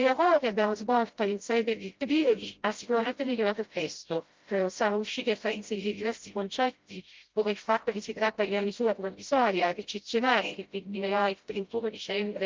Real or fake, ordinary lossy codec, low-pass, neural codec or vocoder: fake; Opus, 24 kbps; 7.2 kHz; codec, 16 kHz, 0.5 kbps, FreqCodec, smaller model